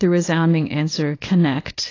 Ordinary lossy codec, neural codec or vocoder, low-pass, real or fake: AAC, 32 kbps; codec, 24 kHz, 0.9 kbps, WavTokenizer, small release; 7.2 kHz; fake